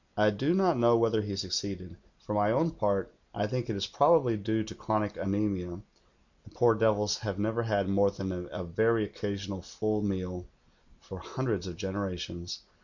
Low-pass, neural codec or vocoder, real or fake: 7.2 kHz; none; real